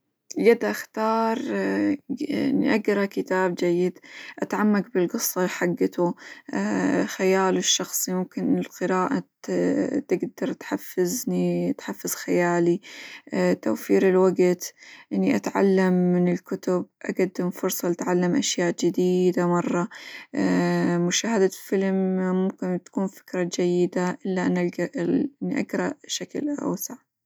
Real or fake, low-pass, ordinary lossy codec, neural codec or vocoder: real; none; none; none